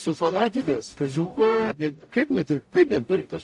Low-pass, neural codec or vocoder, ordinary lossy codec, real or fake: 10.8 kHz; codec, 44.1 kHz, 0.9 kbps, DAC; AAC, 64 kbps; fake